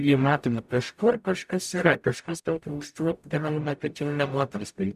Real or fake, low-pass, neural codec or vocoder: fake; 14.4 kHz; codec, 44.1 kHz, 0.9 kbps, DAC